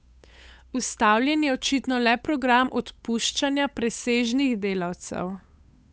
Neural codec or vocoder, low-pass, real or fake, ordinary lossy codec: codec, 16 kHz, 8 kbps, FunCodec, trained on Chinese and English, 25 frames a second; none; fake; none